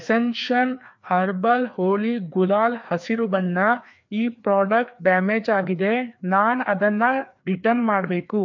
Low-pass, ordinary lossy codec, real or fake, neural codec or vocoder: 7.2 kHz; MP3, 48 kbps; fake; codec, 16 kHz, 2 kbps, FreqCodec, larger model